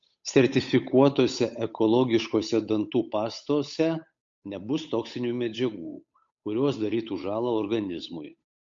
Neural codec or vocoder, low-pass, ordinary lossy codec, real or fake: codec, 16 kHz, 8 kbps, FunCodec, trained on Chinese and English, 25 frames a second; 7.2 kHz; MP3, 48 kbps; fake